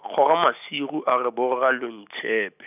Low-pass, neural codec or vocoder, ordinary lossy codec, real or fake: 3.6 kHz; none; none; real